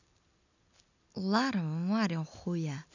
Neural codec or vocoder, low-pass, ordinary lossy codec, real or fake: none; 7.2 kHz; none; real